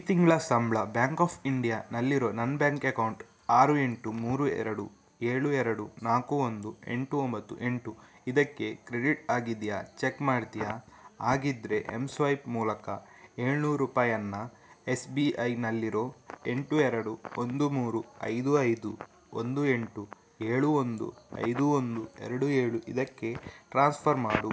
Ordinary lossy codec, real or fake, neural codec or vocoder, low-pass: none; real; none; none